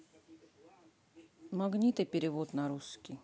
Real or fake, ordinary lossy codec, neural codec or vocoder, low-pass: real; none; none; none